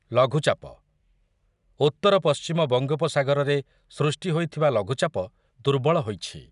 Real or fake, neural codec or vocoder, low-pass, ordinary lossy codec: real; none; 10.8 kHz; none